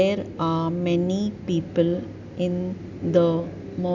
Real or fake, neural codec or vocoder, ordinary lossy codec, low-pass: real; none; none; 7.2 kHz